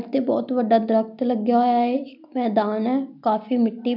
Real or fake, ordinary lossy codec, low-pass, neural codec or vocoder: real; none; 5.4 kHz; none